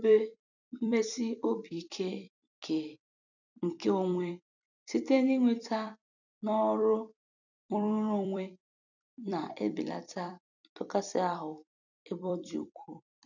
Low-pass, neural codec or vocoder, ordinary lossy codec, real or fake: 7.2 kHz; vocoder, 44.1 kHz, 128 mel bands every 256 samples, BigVGAN v2; none; fake